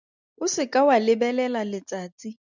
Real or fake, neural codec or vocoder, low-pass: real; none; 7.2 kHz